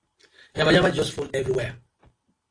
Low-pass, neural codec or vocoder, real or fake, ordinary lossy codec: 9.9 kHz; none; real; AAC, 32 kbps